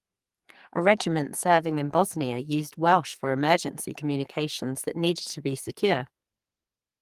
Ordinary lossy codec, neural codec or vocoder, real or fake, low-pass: Opus, 32 kbps; codec, 44.1 kHz, 2.6 kbps, SNAC; fake; 14.4 kHz